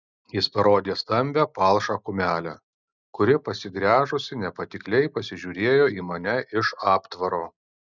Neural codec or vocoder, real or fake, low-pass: none; real; 7.2 kHz